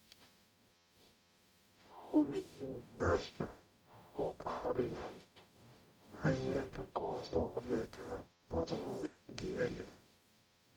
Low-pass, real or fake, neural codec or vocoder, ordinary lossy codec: 19.8 kHz; fake; codec, 44.1 kHz, 0.9 kbps, DAC; none